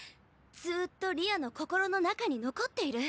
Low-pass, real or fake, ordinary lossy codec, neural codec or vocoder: none; real; none; none